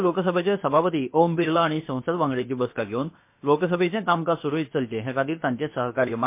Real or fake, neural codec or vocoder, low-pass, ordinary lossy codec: fake; codec, 16 kHz, about 1 kbps, DyCAST, with the encoder's durations; 3.6 kHz; MP3, 24 kbps